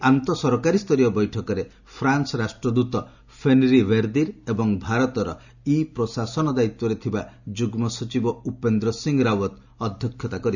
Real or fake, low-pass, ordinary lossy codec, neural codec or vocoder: real; 7.2 kHz; none; none